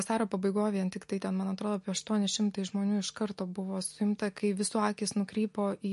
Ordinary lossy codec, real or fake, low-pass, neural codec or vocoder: MP3, 48 kbps; real; 14.4 kHz; none